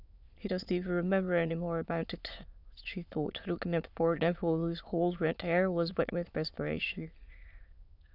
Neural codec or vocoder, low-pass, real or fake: autoencoder, 22.05 kHz, a latent of 192 numbers a frame, VITS, trained on many speakers; 5.4 kHz; fake